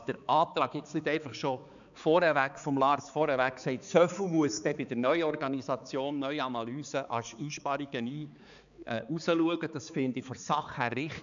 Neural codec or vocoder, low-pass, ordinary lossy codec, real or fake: codec, 16 kHz, 4 kbps, X-Codec, HuBERT features, trained on balanced general audio; 7.2 kHz; none; fake